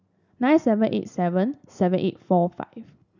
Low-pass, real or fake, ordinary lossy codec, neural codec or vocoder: 7.2 kHz; real; none; none